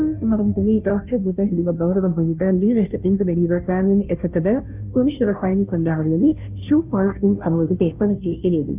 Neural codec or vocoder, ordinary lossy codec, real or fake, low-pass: codec, 16 kHz, 0.5 kbps, FunCodec, trained on Chinese and English, 25 frames a second; none; fake; 3.6 kHz